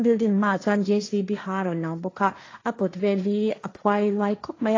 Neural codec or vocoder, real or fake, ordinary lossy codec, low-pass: codec, 16 kHz, 1.1 kbps, Voila-Tokenizer; fake; AAC, 32 kbps; 7.2 kHz